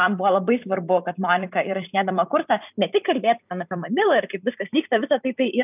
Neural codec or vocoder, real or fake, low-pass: vocoder, 44.1 kHz, 128 mel bands every 256 samples, BigVGAN v2; fake; 3.6 kHz